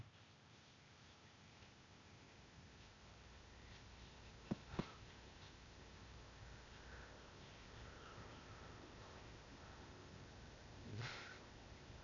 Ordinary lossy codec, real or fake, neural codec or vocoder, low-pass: none; fake; codec, 16 kHz, 0.8 kbps, ZipCodec; 7.2 kHz